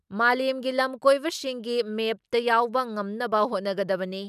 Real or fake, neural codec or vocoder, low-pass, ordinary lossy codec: real; none; 14.4 kHz; none